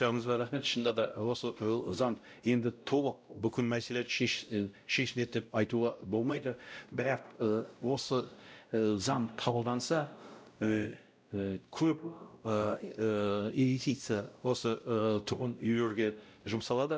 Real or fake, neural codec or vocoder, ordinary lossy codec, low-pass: fake; codec, 16 kHz, 0.5 kbps, X-Codec, WavLM features, trained on Multilingual LibriSpeech; none; none